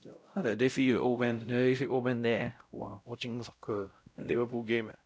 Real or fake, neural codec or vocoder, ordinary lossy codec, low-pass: fake; codec, 16 kHz, 0.5 kbps, X-Codec, WavLM features, trained on Multilingual LibriSpeech; none; none